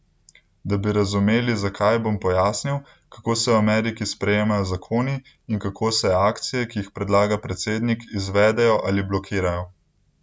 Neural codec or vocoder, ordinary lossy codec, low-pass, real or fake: none; none; none; real